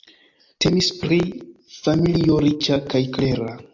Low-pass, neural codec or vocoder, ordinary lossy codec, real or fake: 7.2 kHz; none; AAC, 48 kbps; real